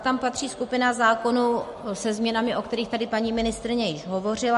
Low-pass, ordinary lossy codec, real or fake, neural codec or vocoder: 14.4 kHz; MP3, 48 kbps; real; none